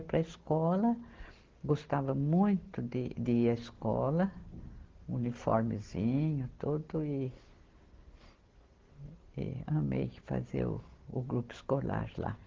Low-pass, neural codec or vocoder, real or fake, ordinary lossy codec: 7.2 kHz; none; real; Opus, 16 kbps